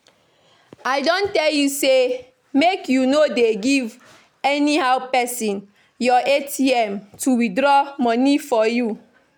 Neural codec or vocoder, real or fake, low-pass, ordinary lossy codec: none; real; none; none